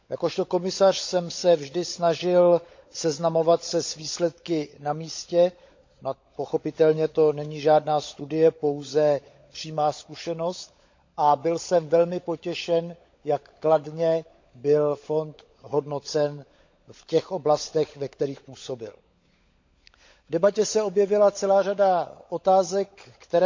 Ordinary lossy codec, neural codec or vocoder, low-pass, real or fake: MP3, 48 kbps; codec, 16 kHz, 16 kbps, FunCodec, trained on LibriTTS, 50 frames a second; 7.2 kHz; fake